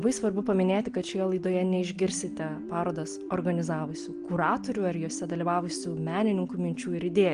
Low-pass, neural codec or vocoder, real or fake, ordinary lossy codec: 9.9 kHz; none; real; Opus, 24 kbps